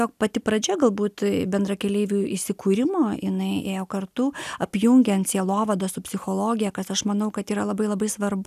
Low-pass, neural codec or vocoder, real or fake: 14.4 kHz; none; real